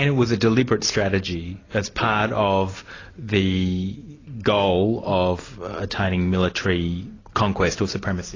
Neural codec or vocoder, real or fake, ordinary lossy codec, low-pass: none; real; AAC, 32 kbps; 7.2 kHz